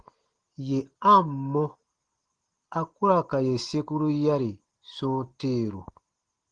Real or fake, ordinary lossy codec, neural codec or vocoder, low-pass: real; Opus, 16 kbps; none; 9.9 kHz